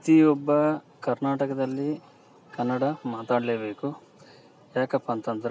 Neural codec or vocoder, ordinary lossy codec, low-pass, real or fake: none; none; none; real